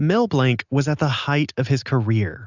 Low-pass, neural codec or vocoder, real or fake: 7.2 kHz; none; real